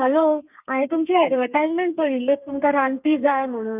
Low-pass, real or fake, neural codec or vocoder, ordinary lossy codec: 3.6 kHz; fake; codec, 32 kHz, 1.9 kbps, SNAC; none